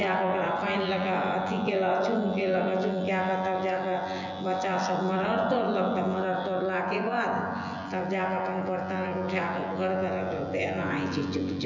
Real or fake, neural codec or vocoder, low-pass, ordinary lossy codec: fake; vocoder, 24 kHz, 100 mel bands, Vocos; 7.2 kHz; none